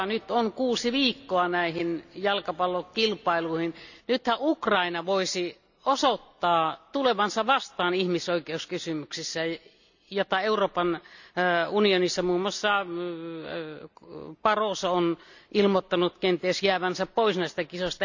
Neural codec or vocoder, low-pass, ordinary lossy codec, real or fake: none; 7.2 kHz; none; real